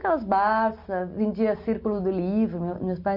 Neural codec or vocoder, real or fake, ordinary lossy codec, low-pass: none; real; none; 5.4 kHz